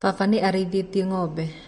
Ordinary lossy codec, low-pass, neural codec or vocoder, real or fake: MP3, 48 kbps; 19.8 kHz; none; real